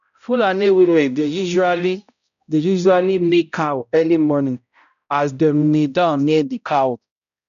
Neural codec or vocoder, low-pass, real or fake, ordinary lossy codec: codec, 16 kHz, 0.5 kbps, X-Codec, HuBERT features, trained on balanced general audio; 7.2 kHz; fake; none